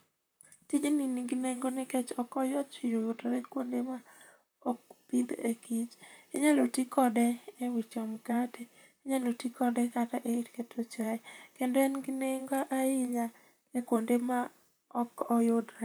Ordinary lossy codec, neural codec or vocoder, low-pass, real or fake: none; vocoder, 44.1 kHz, 128 mel bands, Pupu-Vocoder; none; fake